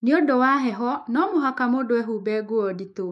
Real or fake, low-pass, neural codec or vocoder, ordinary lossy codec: fake; 14.4 kHz; autoencoder, 48 kHz, 128 numbers a frame, DAC-VAE, trained on Japanese speech; MP3, 48 kbps